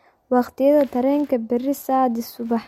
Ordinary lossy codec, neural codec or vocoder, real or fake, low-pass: MP3, 64 kbps; none; real; 19.8 kHz